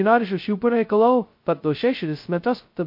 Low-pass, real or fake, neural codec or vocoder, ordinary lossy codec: 5.4 kHz; fake; codec, 16 kHz, 0.2 kbps, FocalCodec; MP3, 32 kbps